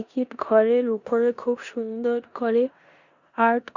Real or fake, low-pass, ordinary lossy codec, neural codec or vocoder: fake; 7.2 kHz; Opus, 64 kbps; codec, 16 kHz in and 24 kHz out, 0.9 kbps, LongCat-Audio-Codec, four codebook decoder